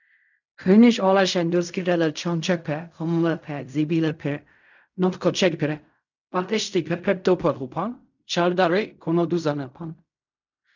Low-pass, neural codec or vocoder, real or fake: 7.2 kHz; codec, 16 kHz in and 24 kHz out, 0.4 kbps, LongCat-Audio-Codec, fine tuned four codebook decoder; fake